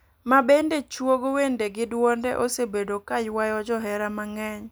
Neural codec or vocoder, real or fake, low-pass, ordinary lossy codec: none; real; none; none